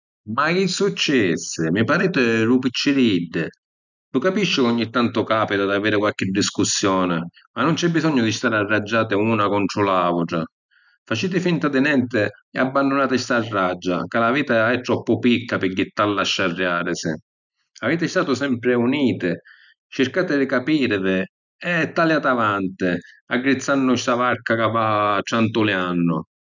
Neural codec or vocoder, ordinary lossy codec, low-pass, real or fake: none; none; 7.2 kHz; real